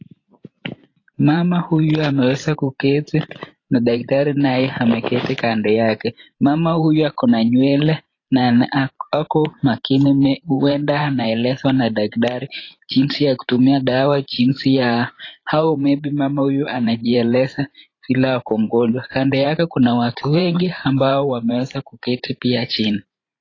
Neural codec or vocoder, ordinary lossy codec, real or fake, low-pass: vocoder, 44.1 kHz, 128 mel bands every 512 samples, BigVGAN v2; AAC, 32 kbps; fake; 7.2 kHz